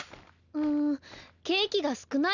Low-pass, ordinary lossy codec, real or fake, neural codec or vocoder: 7.2 kHz; none; real; none